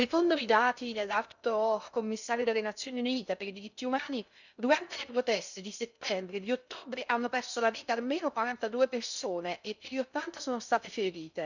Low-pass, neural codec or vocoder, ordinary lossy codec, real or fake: 7.2 kHz; codec, 16 kHz in and 24 kHz out, 0.6 kbps, FocalCodec, streaming, 2048 codes; none; fake